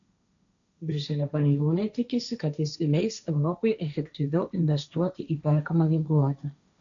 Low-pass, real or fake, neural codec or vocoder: 7.2 kHz; fake; codec, 16 kHz, 1.1 kbps, Voila-Tokenizer